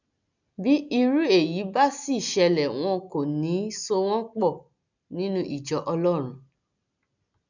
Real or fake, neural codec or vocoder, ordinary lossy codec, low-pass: real; none; none; 7.2 kHz